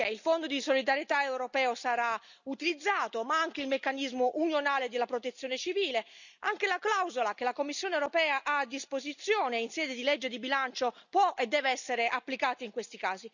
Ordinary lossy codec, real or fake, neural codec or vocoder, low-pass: none; real; none; 7.2 kHz